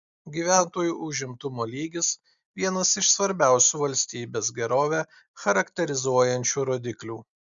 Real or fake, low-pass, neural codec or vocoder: real; 7.2 kHz; none